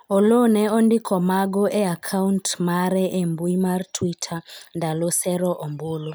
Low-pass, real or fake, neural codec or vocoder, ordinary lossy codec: none; real; none; none